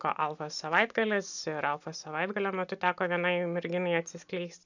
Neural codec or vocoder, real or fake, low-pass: none; real; 7.2 kHz